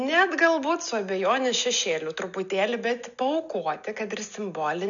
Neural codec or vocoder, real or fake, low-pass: none; real; 7.2 kHz